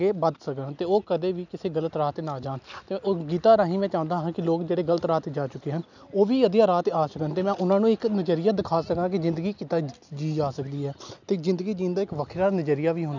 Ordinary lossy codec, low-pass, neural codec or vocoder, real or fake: none; 7.2 kHz; none; real